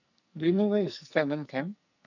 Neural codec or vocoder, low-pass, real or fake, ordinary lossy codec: codec, 44.1 kHz, 2.6 kbps, SNAC; 7.2 kHz; fake; none